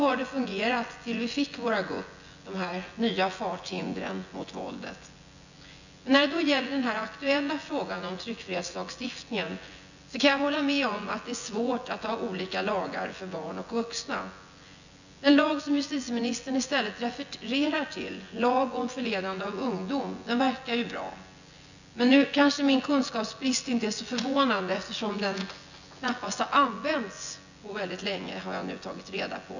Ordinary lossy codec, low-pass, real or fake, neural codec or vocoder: none; 7.2 kHz; fake; vocoder, 24 kHz, 100 mel bands, Vocos